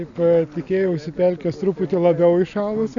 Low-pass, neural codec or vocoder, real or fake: 7.2 kHz; none; real